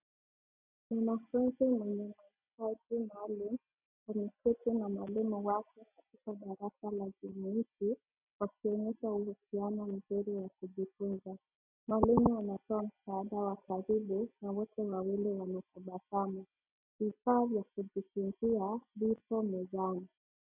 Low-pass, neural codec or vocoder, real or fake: 3.6 kHz; none; real